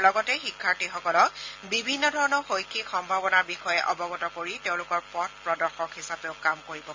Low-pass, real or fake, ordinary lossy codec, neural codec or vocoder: 7.2 kHz; real; MP3, 48 kbps; none